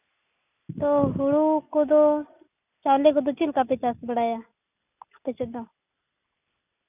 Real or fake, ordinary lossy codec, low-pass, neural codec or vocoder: real; none; 3.6 kHz; none